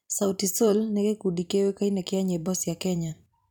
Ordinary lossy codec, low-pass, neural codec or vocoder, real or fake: none; 19.8 kHz; vocoder, 44.1 kHz, 128 mel bands every 256 samples, BigVGAN v2; fake